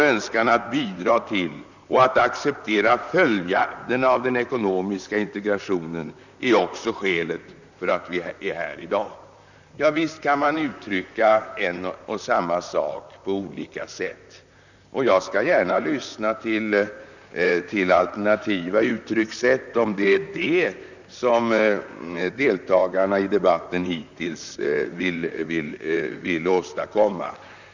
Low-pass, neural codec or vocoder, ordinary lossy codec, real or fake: 7.2 kHz; vocoder, 44.1 kHz, 128 mel bands, Pupu-Vocoder; none; fake